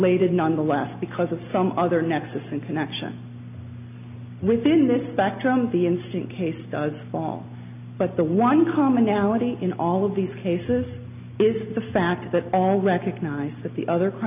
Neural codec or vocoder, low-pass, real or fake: none; 3.6 kHz; real